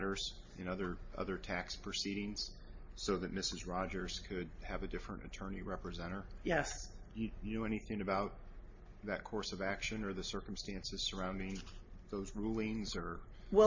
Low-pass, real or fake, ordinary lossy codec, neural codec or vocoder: 7.2 kHz; real; MP3, 32 kbps; none